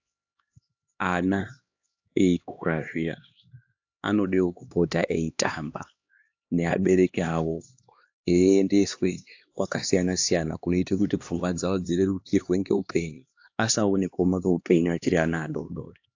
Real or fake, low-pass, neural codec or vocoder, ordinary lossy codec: fake; 7.2 kHz; codec, 16 kHz, 2 kbps, X-Codec, HuBERT features, trained on LibriSpeech; AAC, 48 kbps